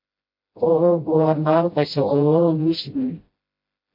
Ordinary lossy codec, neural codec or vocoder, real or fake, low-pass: MP3, 32 kbps; codec, 16 kHz, 0.5 kbps, FreqCodec, smaller model; fake; 5.4 kHz